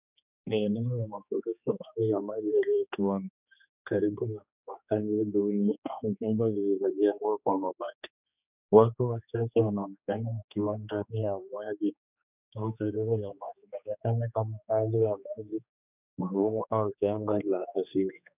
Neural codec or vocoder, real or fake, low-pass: codec, 16 kHz, 2 kbps, X-Codec, HuBERT features, trained on general audio; fake; 3.6 kHz